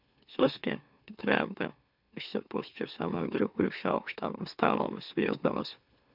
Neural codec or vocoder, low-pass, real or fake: autoencoder, 44.1 kHz, a latent of 192 numbers a frame, MeloTTS; 5.4 kHz; fake